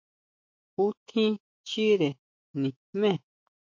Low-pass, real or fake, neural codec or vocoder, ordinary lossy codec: 7.2 kHz; fake; autoencoder, 48 kHz, 128 numbers a frame, DAC-VAE, trained on Japanese speech; MP3, 48 kbps